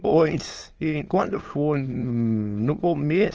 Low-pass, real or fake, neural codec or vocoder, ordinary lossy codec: 7.2 kHz; fake; autoencoder, 22.05 kHz, a latent of 192 numbers a frame, VITS, trained on many speakers; Opus, 24 kbps